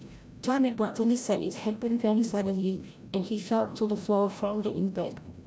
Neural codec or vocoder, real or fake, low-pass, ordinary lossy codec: codec, 16 kHz, 0.5 kbps, FreqCodec, larger model; fake; none; none